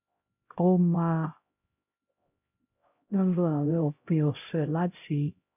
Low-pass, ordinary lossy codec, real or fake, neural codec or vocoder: 3.6 kHz; none; fake; codec, 16 kHz, 0.5 kbps, X-Codec, HuBERT features, trained on LibriSpeech